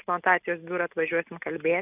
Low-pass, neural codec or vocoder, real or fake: 3.6 kHz; none; real